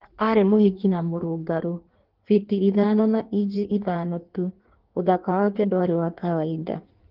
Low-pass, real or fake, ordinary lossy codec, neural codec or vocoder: 5.4 kHz; fake; Opus, 16 kbps; codec, 16 kHz in and 24 kHz out, 1.1 kbps, FireRedTTS-2 codec